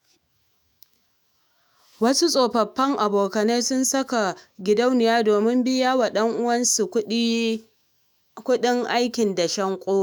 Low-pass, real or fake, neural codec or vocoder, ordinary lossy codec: none; fake; autoencoder, 48 kHz, 128 numbers a frame, DAC-VAE, trained on Japanese speech; none